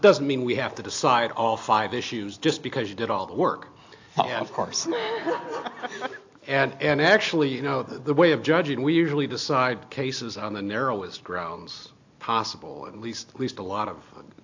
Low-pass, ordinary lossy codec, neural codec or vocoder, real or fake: 7.2 kHz; AAC, 48 kbps; none; real